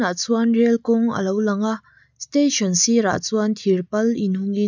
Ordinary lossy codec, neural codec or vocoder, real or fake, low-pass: none; none; real; 7.2 kHz